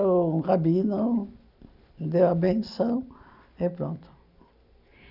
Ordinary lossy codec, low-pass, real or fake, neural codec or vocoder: none; 5.4 kHz; real; none